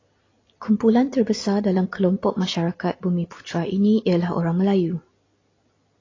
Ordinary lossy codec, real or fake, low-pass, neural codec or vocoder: AAC, 32 kbps; real; 7.2 kHz; none